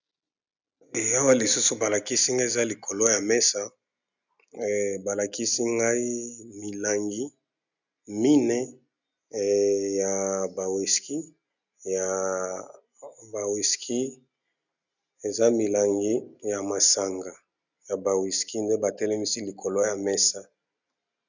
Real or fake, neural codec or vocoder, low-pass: real; none; 7.2 kHz